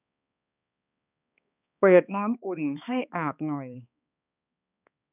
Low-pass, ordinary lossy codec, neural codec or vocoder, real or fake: 3.6 kHz; none; codec, 16 kHz, 2 kbps, X-Codec, HuBERT features, trained on balanced general audio; fake